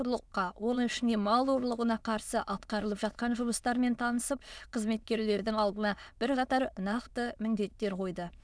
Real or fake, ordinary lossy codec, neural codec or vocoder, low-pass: fake; none; autoencoder, 22.05 kHz, a latent of 192 numbers a frame, VITS, trained on many speakers; none